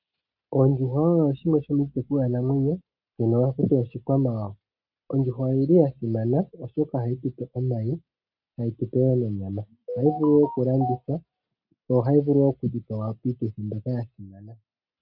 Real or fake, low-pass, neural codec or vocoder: real; 5.4 kHz; none